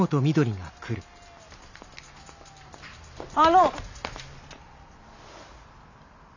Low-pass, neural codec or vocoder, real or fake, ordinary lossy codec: 7.2 kHz; none; real; none